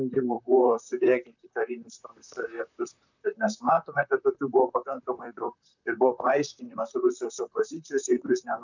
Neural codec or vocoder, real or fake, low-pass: vocoder, 44.1 kHz, 128 mel bands, Pupu-Vocoder; fake; 7.2 kHz